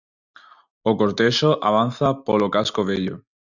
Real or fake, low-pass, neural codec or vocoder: real; 7.2 kHz; none